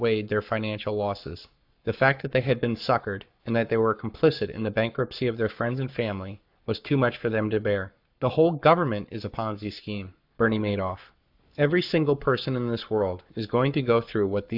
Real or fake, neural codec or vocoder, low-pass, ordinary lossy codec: fake; codec, 44.1 kHz, 7.8 kbps, Pupu-Codec; 5.4 kHz; AAC, 48 kbps